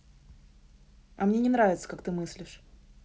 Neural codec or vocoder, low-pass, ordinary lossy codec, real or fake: none; none; none; real